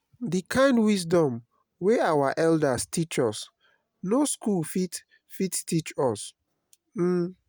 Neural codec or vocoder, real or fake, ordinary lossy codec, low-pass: none; real; none; none